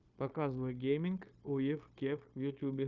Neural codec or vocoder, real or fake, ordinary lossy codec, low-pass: codec, 16 kHz, 0.9 kbps, LongCat-Audio-Codec; fake; Opus, 24 kbps; 7.2 kHz